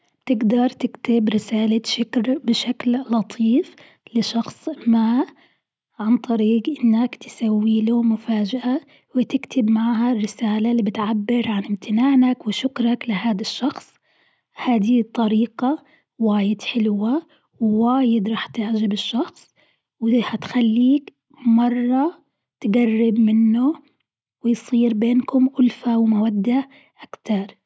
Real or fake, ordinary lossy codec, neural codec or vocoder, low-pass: real; none; none; none